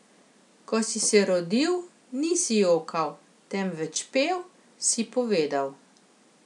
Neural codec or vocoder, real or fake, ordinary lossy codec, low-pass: none; real; none; 10.8 kHz